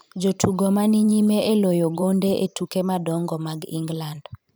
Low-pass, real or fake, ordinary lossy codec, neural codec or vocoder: none; fake; none; vocoder, 44.1 kHz, 128 mel bands every 512 samples, BigVGAN v2